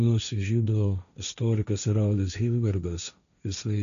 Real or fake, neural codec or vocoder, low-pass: fake; codec, 16 kHz, 1.1 kbps, Voila-Tokenizer; 7.2 kHz